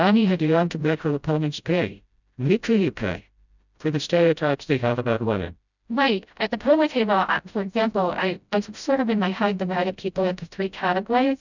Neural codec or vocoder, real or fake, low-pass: codec, 16 kHz, 0.5 kbps, FreqCodec, smaller model; fake; 7.2 kHz